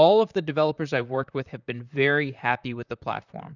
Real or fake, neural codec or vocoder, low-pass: fake; vocoder, 44.1 kHz, 128 mel bands, Pupu-Vocoder; 7.2 kHz